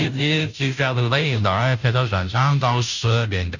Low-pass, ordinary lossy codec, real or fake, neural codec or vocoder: 7.2 kHz; none; fake; codec, 16 kHz, 0.5 kbps, FunCodec, trained on Chinese and English, 25 frames a second